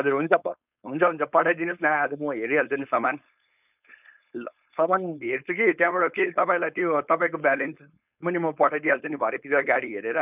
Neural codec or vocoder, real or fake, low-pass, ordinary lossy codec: codec, 16 kHz, 4.8 kbps, FACodec; fake; 3.6 kHz; none